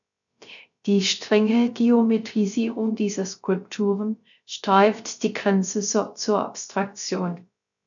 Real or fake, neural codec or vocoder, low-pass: fake; codec, 16 kHz, 0.3 kbps, FocalCodec; 7.2 kHz